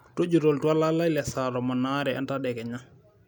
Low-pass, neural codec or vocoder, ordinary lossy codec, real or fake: none; none; none; real